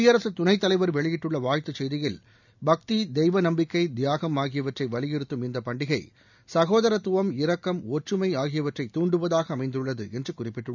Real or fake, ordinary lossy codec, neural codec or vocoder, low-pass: real; none; none; 7.2 kHz